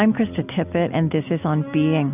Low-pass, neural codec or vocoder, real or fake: 3.6 kHz; none; real